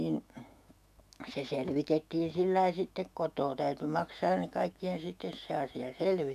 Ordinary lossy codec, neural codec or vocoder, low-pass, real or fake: none; autoencoder, 48 kHz, 128 numbers a frame, DAC-VAE, trained on Japanese speech; 14.4 kHz; fake